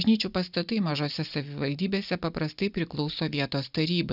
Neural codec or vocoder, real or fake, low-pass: none; real; 5.4 kHz